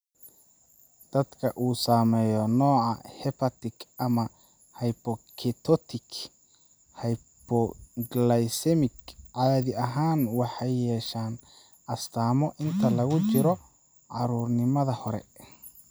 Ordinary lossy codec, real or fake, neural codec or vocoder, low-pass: none; real; none; none